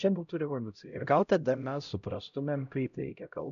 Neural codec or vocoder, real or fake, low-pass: codec, 16 kHz, 0.5 kbps, X-Codec, HuBERT features, trained on LibriSpeech; fake; 7.2 kHz